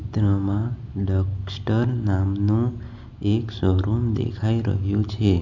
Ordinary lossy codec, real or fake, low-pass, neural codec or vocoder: none; real; 7.2 kHz; none